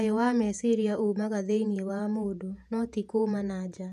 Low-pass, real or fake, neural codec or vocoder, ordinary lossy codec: 14.4 kHz; fake; vocoder, 48 kHz, 128 mel bands, Vocos; none